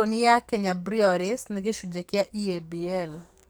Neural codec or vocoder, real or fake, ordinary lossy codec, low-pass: codec, 44.1 kHz, 2.6 kbps, SNAC; fake; none; none